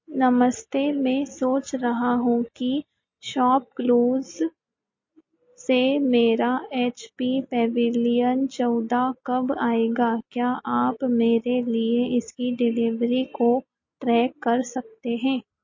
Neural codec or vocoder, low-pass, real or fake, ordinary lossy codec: none; 7.2 kHz; real; MP3, 32 kbps